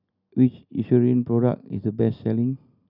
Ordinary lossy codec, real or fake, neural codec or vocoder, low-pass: none; real; none; 5.4 kHz